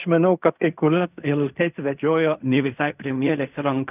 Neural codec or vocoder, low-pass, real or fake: codec, 16 kHz in and 24 kHz out, 0.4 kbps, LongCat-Audio-Codec, fine tuned four codebook decoder; 3.6 kHz; fake